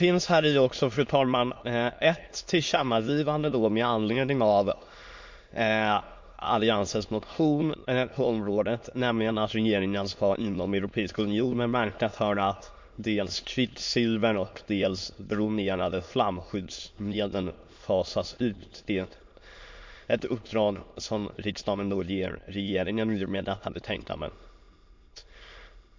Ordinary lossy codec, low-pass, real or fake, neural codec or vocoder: MP3, 48 kbps; 7.2 kHz; fake; autoencoder, 22.05 kHz, a latent of 192 numbers a frame, VITS, trained on many speakers